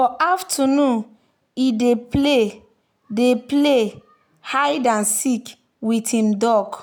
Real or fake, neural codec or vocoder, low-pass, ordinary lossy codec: real; none; none; none